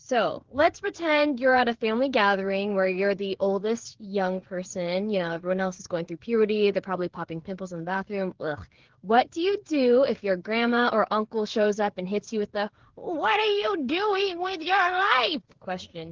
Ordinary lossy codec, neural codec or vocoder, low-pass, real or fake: Opus, 16 kbps; codec, 16 kHz, 8 kbps, FreqCodec, smaller model; 7.2 kHz; fake